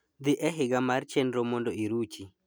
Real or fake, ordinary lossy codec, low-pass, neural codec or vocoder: real; none; none; none